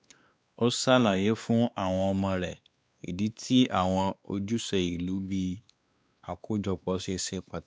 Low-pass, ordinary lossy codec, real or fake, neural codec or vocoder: none; none; fake; codec, 16 kHz, 2 kbps, X-Codec, WavLM features, trained on Multilingual LibriSpeech